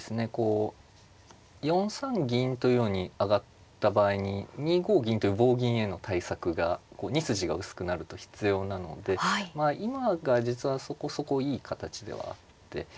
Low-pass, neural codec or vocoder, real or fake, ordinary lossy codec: none; none; real; none